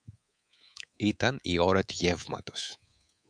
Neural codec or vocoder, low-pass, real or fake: codec, 24 kHz, 3.1 kbps, DualCodec; 9.9 kHz; fake